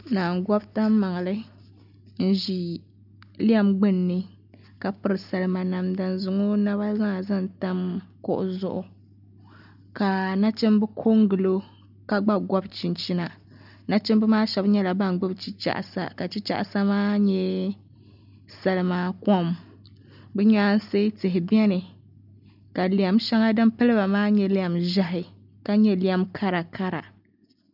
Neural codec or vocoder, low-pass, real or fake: none; 5.4 kHz; real